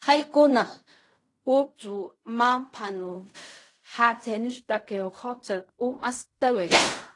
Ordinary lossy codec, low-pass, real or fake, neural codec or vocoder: AAC, 48 kbps; 10.8 kHz; fake; codec, 16 kHz in and 24 kHz out, 0.4 kbps, LongCat-Audio-Codec, fine tuned four codebook decoder